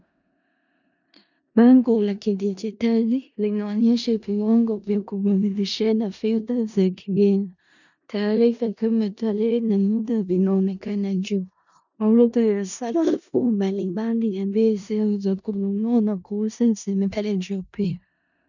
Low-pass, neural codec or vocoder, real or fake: 7.2 kHz; codec, 16 kHz in and 24 kHz out, 0.4 kbps, LongCat-Audio-Codec, four codebook decoder; fake